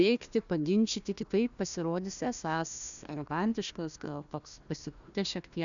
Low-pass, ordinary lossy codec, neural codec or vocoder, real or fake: 7.2 kHz; MP3, 96 kbps; codec, 16 kHz, 1 kbps, FunCodec, trained on Chinese and English, 50 frames a second; fake